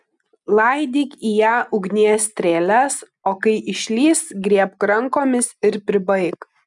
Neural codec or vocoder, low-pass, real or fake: none; 10.8 kHz; real